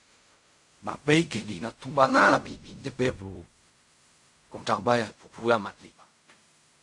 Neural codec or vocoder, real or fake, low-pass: codec, 16 kHz in and 24 kHz out, 0.4 kbps, LongCat-Audio-Codec, fine tuned four codebook decoder; fake; 10.8 kHz